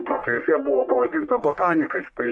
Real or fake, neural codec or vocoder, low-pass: fake; codec, 44.1 kHz, 1.7 kbps, Pupu-Codec; 10.8 kHz